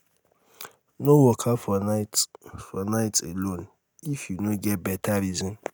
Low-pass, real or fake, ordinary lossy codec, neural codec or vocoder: none; real; none; none